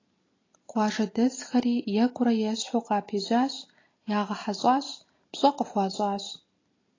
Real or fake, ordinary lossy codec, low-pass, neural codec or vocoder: real; AAC, 32 kbps; 7.2 kHz; none